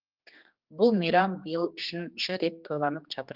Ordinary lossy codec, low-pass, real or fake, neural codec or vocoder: Opus, 32 kbps; 5.4 kHz; fake; codec, 16 kHz, 2 kbps, X-Codec, HuBERT features, trained on general audio